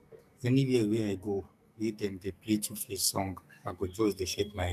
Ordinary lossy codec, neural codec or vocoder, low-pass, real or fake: none; codec, 44.1 kHz, 2.6 kbps, SNAC; 14.4 kHz; fake